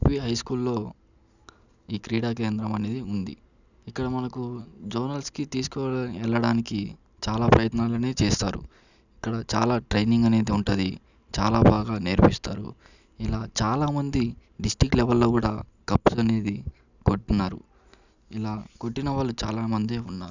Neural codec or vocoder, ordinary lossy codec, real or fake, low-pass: none; none; real; 7.2 kHz